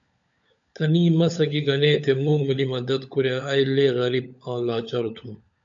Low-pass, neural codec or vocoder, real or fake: 7.2 kHz; codec, 16 kHz, 16 kbps, FunCodec, trained on LibriTTS, 50 frames a second; fake